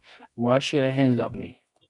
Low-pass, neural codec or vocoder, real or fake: 10.8 kHz; codec, 24 kHz, 0.9 kbps, WavTokenizer, medium music audio release; fake